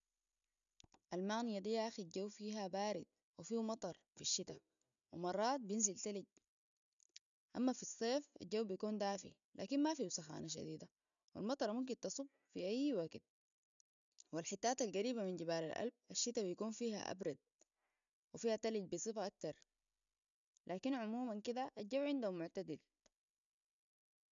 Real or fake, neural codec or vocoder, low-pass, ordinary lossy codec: real; none; 7.2 kHz; none